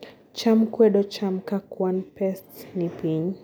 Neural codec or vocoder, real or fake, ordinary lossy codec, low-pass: none; real; none; none